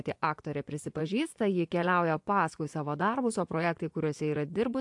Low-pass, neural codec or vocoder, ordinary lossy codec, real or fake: 10.8 kHz; vocoder, 24 kHz, 100 mel bands, Vocos; AAC, 64 kbps; fake